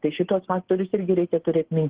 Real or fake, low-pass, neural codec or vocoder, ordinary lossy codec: real; 3.6 kHz; none; Opus, 16 kbps